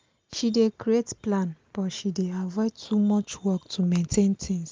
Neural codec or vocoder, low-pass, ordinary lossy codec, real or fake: none; 7.2 kHz; Opus, 24 kbps; real